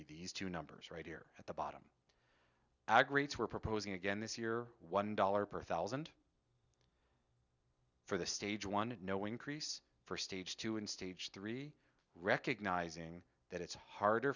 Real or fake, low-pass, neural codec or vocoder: real; 7.2 kHz; none